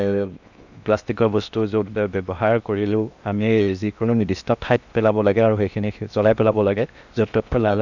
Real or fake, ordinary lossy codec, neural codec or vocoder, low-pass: fake; none; codec, 16 kHz in and 24 kHz out, 0.8 kbps, FocalCodec, streaming, 65536 codes; 7.2 kHz